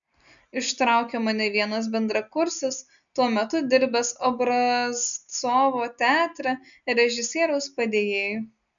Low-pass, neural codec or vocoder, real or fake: 7.2 kHz; none; real